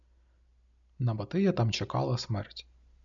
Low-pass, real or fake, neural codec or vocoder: 7.2 kHz; real; none